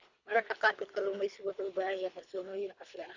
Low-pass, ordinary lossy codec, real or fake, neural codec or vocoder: 7.2 kHz; Opus, 64 kbps; fake; codec, 24 kHz, 3 kbps, HILCodec